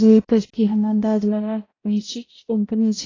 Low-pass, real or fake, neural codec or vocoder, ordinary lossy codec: 7.2 kHz; fake; codec, 16 kHz, 0.5 kbps, X-Codec, HuBERT features, trained on balanced general audio; AAC, 32 kbps